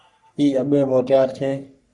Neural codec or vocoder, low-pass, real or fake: codec, 44.1 kHz, 3.4 kbps, Pupu-Codec; 10.8 kHz; fake